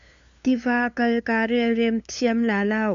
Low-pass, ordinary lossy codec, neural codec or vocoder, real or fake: 7.2 kHz; MP3, 64 kbps; codec, 16 kHz, 2 kbps, FunCodec, trained on LibriTTS, 25 frames a second; fake